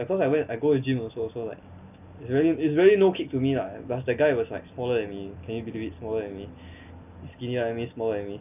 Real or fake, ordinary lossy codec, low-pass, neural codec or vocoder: real; none; 3.6 kHz; none